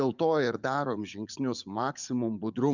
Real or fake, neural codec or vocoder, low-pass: fake; vocoder, 44.1 kHz, 80 mel bands, Vocos; 7.2 kHz